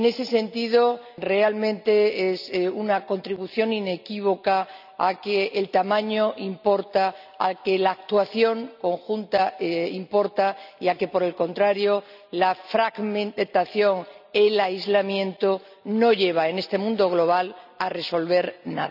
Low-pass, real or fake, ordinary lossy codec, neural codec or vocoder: 5.4 kHz; real; none; none